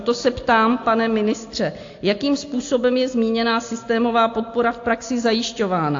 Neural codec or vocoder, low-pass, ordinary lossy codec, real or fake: none; 7.2 kHz; AAC, 48 kbps; real